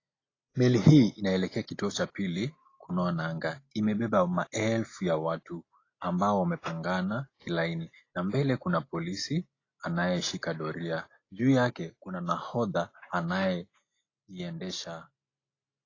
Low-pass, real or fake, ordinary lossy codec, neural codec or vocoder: 7.2 kHz; real; AAC, 32 kbps; none